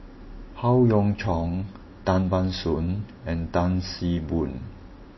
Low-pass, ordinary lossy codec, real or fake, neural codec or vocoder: 7.2 kHz; MP3, 24 kbps; real; none